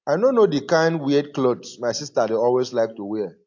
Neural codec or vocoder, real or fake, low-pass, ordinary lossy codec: none; real; 7.2 kHz; AAC, 48 kbps